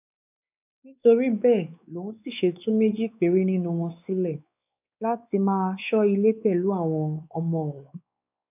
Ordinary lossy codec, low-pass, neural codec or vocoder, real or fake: none; 3.6 kHz; codec, 16 kHz, 4 kbps, X-Codec, WavLM features, trained on Multilingual LibriSpeech; fake